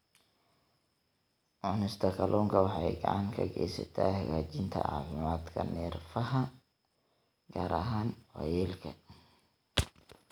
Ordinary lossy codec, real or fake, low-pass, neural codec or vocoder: none; real; none; none